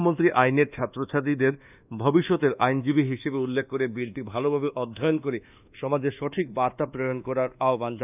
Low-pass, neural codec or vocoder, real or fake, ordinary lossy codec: 3.6 kHz; codec, 24 kHz, 1.2 kbps, DualCodec; fake; none